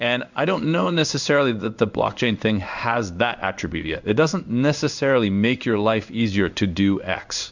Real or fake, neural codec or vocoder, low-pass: fake; codec, 16 kHz in and 24 kHz out, 1 kbps, XY-Tokenizer; 7.2 kHz